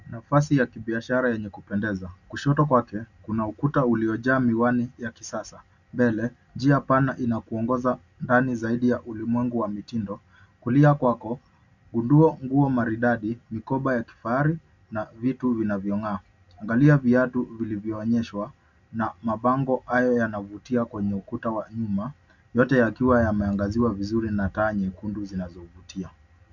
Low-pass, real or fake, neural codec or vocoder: 7.2 kHz; real; none